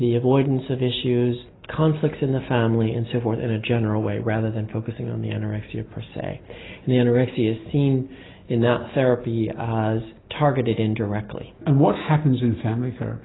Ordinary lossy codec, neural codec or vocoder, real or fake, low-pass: AAC, 16 kbps; none; real; 7.2 kHz